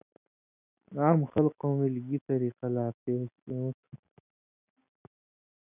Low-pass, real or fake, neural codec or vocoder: 3.6 kHz; real; none